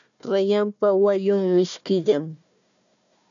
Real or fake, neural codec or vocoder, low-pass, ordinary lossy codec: fake; codec, 16 kHz, 1 kbps, FunCodec, trained on Chinese and English, 50 frames a second; 7.2 kHz; MP3, 96 kbps